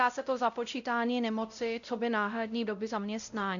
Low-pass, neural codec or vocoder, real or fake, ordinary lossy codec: 7.2 kHz; codec, 16 kHz, 0.5 kbps, X-Codec, WavLM features, trained on Multilingual LibriSpeech; fake; MP3, 96 kbps